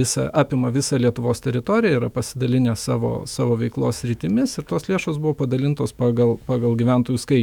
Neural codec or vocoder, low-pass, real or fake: autoencoder, 48 kHz, 128 numbers a frame, DAC-VAE, trained on Japanese speech; 19.8 kHz; fake